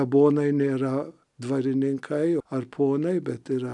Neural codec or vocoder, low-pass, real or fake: none; 10.8 kHz; real